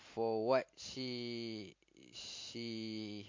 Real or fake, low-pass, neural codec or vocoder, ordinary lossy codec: real; 7.2 kHz; none; MP3, 48 kbps